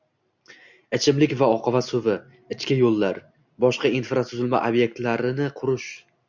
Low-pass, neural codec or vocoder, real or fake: 7.2 kHz; none; real